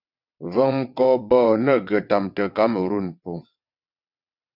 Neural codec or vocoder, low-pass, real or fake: vocoder, 22.05 kHz, 80 mel bands, WaveNeXt; 5.4 kHz; fake